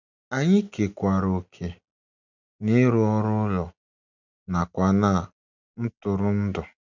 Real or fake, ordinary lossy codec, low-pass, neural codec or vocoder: real; none; 7.2 kHz; none